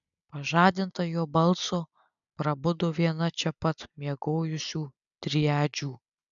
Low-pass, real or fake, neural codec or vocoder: 7.2 kHz; real; none